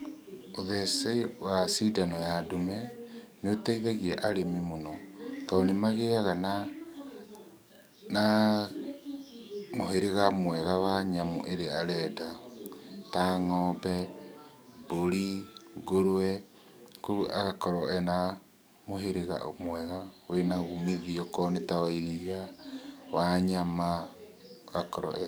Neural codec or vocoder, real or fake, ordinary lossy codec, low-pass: codec, 44.1 kHz, 7.8 kbps, DAC; fake; none; none